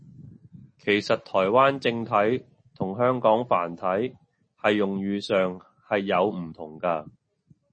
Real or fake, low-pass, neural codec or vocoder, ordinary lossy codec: real; 9.9 kHz; none; MP3, 32 kbps